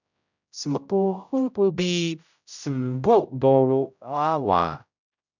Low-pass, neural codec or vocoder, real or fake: 7.2 kHz; codec, 16 kHz, 0.5 kbps, X-Codec, HuBERT features, trained on general audio; fake